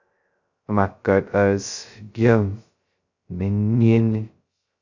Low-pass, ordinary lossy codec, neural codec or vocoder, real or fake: 7.2 kHz; Opus, 64 kbps; codec, 16 kHz, 0.2 kbps, FocalCodec; fake